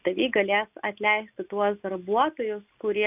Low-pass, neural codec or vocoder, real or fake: 3.6 kHz; none; real